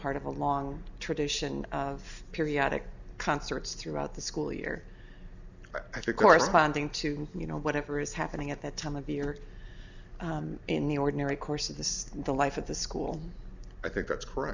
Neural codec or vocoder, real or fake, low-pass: none; real; 7.2 kHz